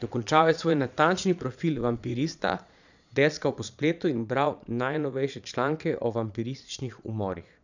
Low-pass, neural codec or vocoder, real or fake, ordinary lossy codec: 7.2 kHz; vocoder, 22.05 kHz, 80 mel bands, Vocos; fake; none